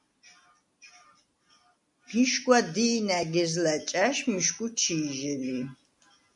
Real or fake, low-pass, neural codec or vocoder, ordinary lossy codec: real; 10.8 kHz; none; MP3, 96 kbps